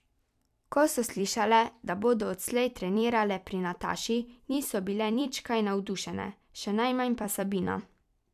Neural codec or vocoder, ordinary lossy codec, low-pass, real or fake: vocoder, 44.1 kHz, 128 mel bands every 256 samples, BigVGAN v2; none; 14.4 kHz; fake